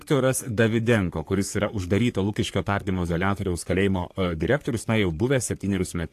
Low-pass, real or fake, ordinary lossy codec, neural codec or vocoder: 14.4 kHz; fake; AAC, 64 kbps; codec, 44.1 kHz, 3.4 kbps, Pupu-Codec